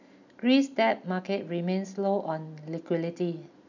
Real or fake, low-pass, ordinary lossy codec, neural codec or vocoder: real; 7.2 kHz; none; none